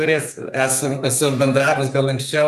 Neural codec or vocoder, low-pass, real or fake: codec, 32 kHz, 1.9 kbps, SNAC; 14.4 kHz; fake